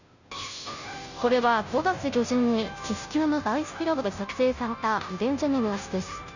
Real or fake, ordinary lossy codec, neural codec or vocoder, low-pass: fake; none; codec, 16 kHz, 0.5 kbps, FunCodec, trained on Chinese and English, 25 frames a second; 7.2 kHz